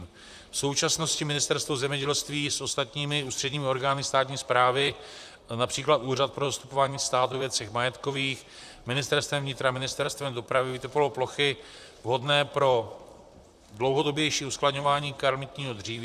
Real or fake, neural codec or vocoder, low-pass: fake; vocoder, 44.1 kHz, 128 mel bands, Pupu-Vocoder; 14.4 kHz